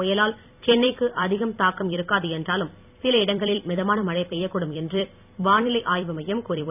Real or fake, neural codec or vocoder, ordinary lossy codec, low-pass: fake; vocoder, 44.1 kHz, 128 mel bands every 256 samples, BigVGAN v2; none; 3.6 kHz